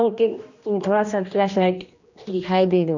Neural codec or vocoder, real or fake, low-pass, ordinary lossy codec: codec, 16 kHz, 1 kbps, X-Codec, HuBERT features, trained on general audio; fake; 7.2 kHz; none